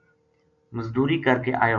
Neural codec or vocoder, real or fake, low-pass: none; real; 7.2 kHz